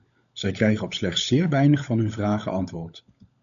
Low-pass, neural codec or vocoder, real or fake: 7.2 kHz; codec, 16 kHz, 16 kbps, FunCodec, trained on LibriTTS, 50 frames a second; fake